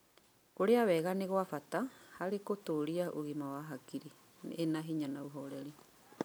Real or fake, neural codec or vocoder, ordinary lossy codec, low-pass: real; none; none; none